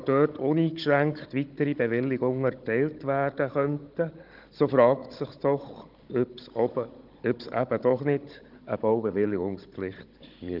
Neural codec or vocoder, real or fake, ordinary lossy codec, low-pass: codec, 16 kHz, 16 kbps, FunCodec, trained on Chinese and English, 50 frames a second; fake; Opus, 32 kbps; 5.4 kHz